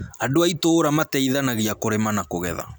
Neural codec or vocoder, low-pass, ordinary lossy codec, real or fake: none; none; none; real